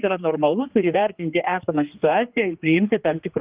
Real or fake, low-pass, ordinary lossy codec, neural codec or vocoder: fake; 3.6 kHz; Opus, 32 kbps; codec, 16 kHz, 2 kbps, X-Codec, HuBERT features, trained on general audio